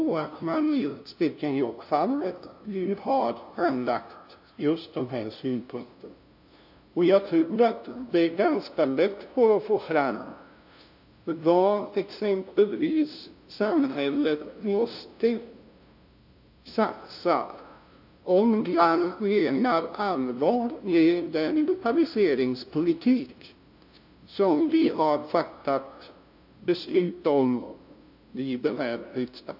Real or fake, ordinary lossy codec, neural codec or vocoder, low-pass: fake; AAC, 48 kbps; codec, 16 kHz, 0.5 kbps, FunCodec, trained on LibriTTS, 25 frames a second; 5.4 kHz